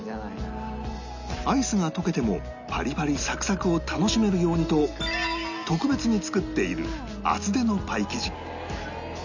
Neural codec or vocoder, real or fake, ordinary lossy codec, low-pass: none; real; none; 7.2 kHz